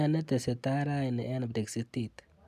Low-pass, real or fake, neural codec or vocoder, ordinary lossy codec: 14.4 kHz; real; none; none